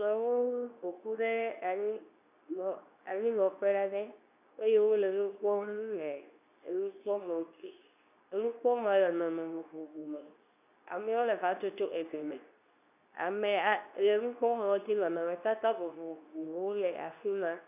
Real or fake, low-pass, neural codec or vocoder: fake; 3.6 kHz; codec, 24 kHz, 0.9 kbps, WavTokenizer, medium speech release version 2